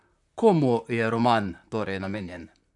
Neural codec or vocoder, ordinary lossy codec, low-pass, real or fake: vocoder, 44.1 kHz, 128 mel bands, Pupu-Vocoder; MP3, 96 kbps; 10.8 kHz; fake